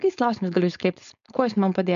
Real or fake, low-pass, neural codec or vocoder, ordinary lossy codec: fake; 7.2 kHz; codec, 16 kHz, 4.8 kbps, FACodec; AAC, 96 kbps